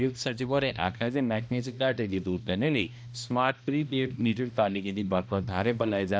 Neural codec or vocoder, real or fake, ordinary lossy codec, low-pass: codec, 16 kHz, 1 kbps, X-Codec, HuBERT features, trained on balanced general audio; fake; none; none